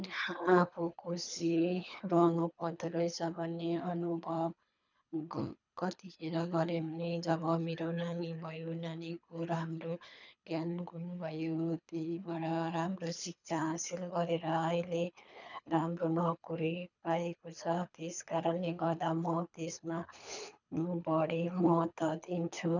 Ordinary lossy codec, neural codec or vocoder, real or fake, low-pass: none; codec, 24 kHz, 3 kbps, HILCodec; fake; 7.2 kHz